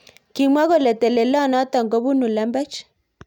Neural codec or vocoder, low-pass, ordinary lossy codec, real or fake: none; 19.8 kHz; none; real